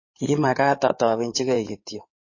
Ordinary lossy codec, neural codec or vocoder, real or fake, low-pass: MP3, 32 kbps; codec, 16 kHz in and 24 kHz out, 2.2 kbps, FireRedTTS-2 codec; fake; 7.2 kHz